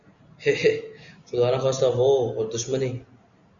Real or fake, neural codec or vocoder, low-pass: real; none; 7.2 kHz